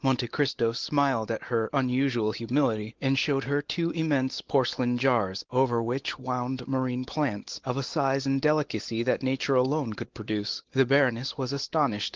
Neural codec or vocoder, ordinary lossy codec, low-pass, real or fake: none; Opus, 16 kbps; 7.2 kHz; real